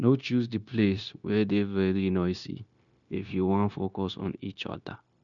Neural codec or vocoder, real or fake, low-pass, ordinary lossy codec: codec, 16 kHz, 0.9 kbps, LongCat-Audio-Codec; fake; 7.2 kHz; MP3, 96 kbps